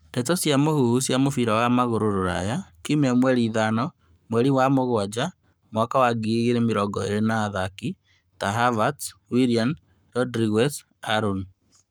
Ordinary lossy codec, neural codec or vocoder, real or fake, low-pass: none; codec, 44.1 kHz, 7.8 kbps, Pupu-Codec; fake; none